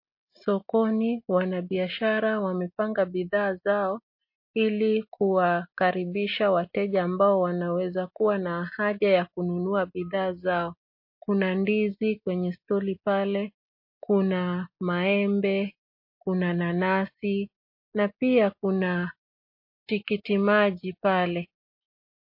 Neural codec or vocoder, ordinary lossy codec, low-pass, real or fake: none; MP3, 32 kbps; 5.4 kHz; real